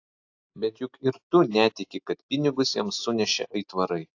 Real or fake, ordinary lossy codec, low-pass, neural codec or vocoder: real; AAC, 48 kbps; 7.2 kHz; none